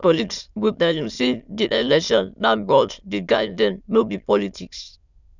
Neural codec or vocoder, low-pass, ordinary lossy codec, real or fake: autoencoder, 22.05 kHz, a latent of 192 numbers a frame, VITS, trained on many speakers; 7.2 kHz; none; fake